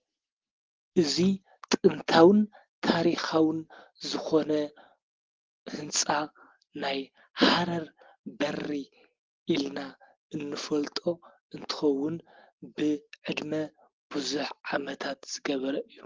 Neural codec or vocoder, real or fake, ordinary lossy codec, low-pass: none; real; Opus, 16 kbps; 7.2 kHz